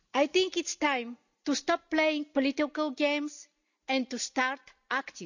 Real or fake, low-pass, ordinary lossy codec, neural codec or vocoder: real; 7.2 kHz; none; none